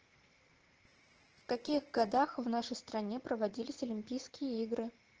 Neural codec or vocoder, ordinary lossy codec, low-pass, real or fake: vocoder, 44.1 kHz, 80 mel bands, Vocos; Opus, 16 kbps; 7.2 kHz; fake